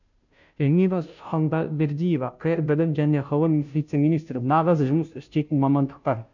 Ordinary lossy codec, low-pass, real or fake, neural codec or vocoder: none; 7.2 kHz; fake; codec, 16 kHz, 0.5 kbps, FunCodec, trained on Chinese and English, 25 frames a second